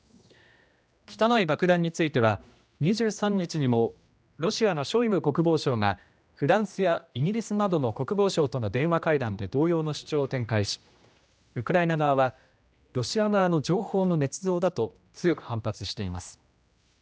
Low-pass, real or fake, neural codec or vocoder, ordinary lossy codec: none; fake; codec, 16 kHz, 1 kbps, X-Codec, HuBERT features, trained on general audio; none